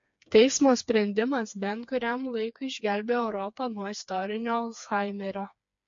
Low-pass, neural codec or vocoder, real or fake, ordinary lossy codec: 7.2 kHz; codec, 16 kHz, 4 kbps, FreqCodec, smaller model; fake; MP3, 48 kbps